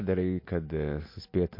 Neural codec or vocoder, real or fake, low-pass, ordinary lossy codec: none; real; 5.4 kHz; MP3, 32 kbps